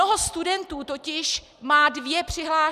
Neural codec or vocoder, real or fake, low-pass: none; real; 14.4 kHz